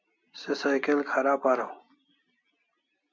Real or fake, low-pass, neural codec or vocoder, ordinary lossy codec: real; 7.2 kHz; none; MP3, 64 kbps